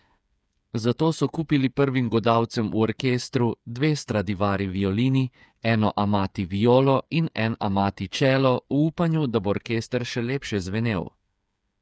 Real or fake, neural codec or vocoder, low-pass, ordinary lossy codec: fake; codec, 16 kHz, 8 kbps, FreqCodec, smaller model; none; none